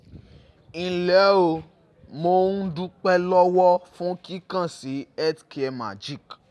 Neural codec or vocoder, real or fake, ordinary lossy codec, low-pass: none; real; none; none